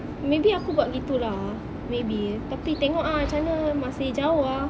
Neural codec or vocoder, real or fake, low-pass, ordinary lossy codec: none; real; none; none